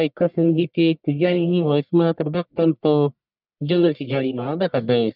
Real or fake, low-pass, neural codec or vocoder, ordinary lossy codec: fake; 5.4 kHz; codec, 44.1 kHz, 1.7 kbps, Pupu-Codec; none